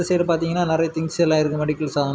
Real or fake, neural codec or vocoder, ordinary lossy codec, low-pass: real; none; none; none